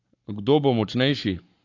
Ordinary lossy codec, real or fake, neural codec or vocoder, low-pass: AAC, 48 kbps; fake; codec, 44.1 kHz, 7.8 kbps, Pupu-Codec; 7.2 kHz